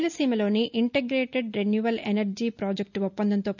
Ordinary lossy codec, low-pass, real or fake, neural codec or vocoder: none; 7.2 kHz; real; none